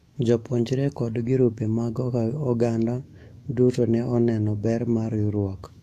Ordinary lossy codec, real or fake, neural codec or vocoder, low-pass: AAC, 64 kbps; fake; autoencoder, 48 kHz, 128 numbers a frame, DAC-VAE, trained on Japanese speech; 14.4 kHz